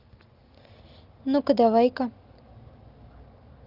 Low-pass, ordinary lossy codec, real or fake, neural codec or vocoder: 5.4 kHz; Opus, 32 kbps; real; none